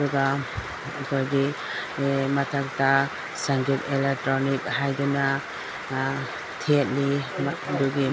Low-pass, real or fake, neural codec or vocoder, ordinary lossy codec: none; real; none; none